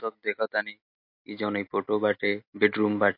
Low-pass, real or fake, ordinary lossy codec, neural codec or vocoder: 5.4 kHz; real; MP3, 32 kbps; none